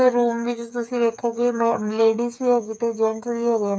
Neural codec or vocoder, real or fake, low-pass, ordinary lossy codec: codec, 16 kHz, 8 kbps, FreqCodec, smaller model; fake; none; none